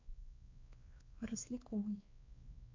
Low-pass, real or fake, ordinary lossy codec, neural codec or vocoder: 7.2 kHz; fake; MP3, 48 kbps; codec, 16 kHz, 2 kbps, X-Codec, WavLM features, trained on Multilingual LibriSpeech